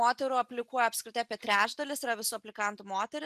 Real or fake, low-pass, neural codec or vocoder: real; 14.4 kHz; none